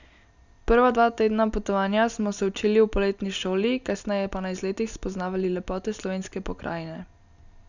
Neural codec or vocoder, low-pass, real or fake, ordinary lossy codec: none; 7.2 kHz; real; none